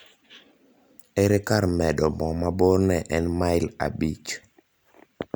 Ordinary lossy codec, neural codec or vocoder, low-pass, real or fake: none; none; none; real